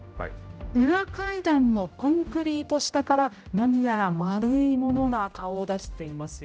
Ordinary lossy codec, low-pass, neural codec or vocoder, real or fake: none; none; codec, 16 kHz, 0.5 kbps, X-Codec, HuBERT features, trained on general audio; fake